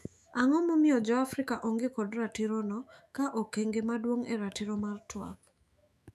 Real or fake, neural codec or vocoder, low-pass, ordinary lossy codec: fake; autoencoder, 48 kHz, 128 numbers a frame, DAC-VAE, trained on Japanese speech; 14.4 kHz; none